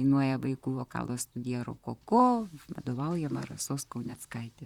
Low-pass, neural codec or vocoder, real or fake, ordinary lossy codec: 19.8 kHz; vocoder, 44.1 kHz, 128 mel bands, Pupu-Vocoder; fake; Opus, 64 kbps